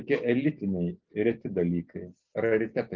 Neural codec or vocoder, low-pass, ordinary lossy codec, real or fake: none; 7.2 kHz; Opus, 24 kbps; real